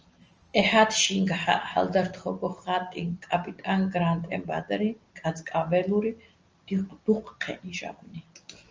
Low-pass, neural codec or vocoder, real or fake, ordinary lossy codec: 7.2 kHz; none; real; Opus, 24 kbps